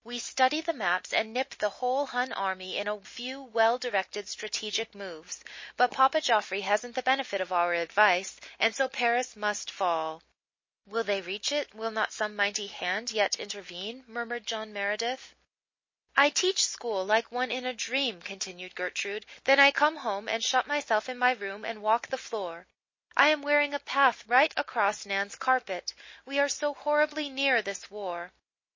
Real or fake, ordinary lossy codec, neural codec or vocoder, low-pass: real; MP3, 32 kbps; none; 7.2 kHz